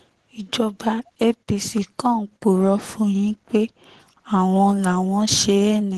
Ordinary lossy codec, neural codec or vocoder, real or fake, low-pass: Opus, 16 kbps; vocoder, 24 kHz, 100 mel bands, Vocos; fake; 10.8 kHz